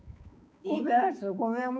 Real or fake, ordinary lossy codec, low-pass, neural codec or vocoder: fake; none; none; codec, 16 kHz, 4 kbps, X-Codec, HuBERT features, trained on balanced general audio